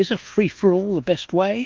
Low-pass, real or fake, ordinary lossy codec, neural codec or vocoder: 7.2 kHz; fake; Opus, 24 kbps; codec, 24 kHz, 1.2 kbps, DualCodec